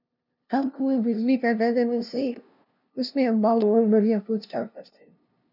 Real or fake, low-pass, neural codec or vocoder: fake; 5.4 kHz; codec, 16 kHz, 0.5 kbps, FunCodec, trained on LibriTTS, 25 frames a second